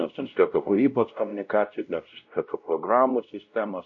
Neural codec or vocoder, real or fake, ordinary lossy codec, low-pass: codec, 16 kHz, 0.5 kbps, X-Codec, WavLM features, trained on Multilingual LibriSpeech; fake; MP3, 48 kbps; 7.2 kHz